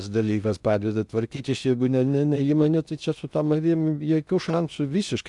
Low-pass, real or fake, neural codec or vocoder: 10.8 kHz; fake; codec, 16 kHz in and 24 kHz out, 0.6 kbps, FocalCodec, streaming, 2048 codes